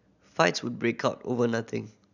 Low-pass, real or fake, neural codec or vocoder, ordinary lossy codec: 7.2 kHz; real; none; none